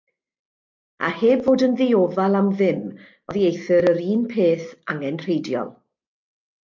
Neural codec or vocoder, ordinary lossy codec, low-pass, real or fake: none; MP3, 48 kbps; 7.2 kHz; real